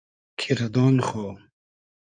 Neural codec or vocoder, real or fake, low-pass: codec, 16 kHz in and 24 kHz out, 2.2 kbps, FireRedTTS-2 codec; fake; 9.9 kHz